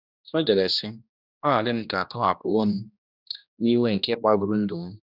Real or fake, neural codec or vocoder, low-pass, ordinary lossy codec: fake; codec, 16 kHz, 1 kbps, X-Codec, HuBERT features, trained on general audio; 5.4 kHz; none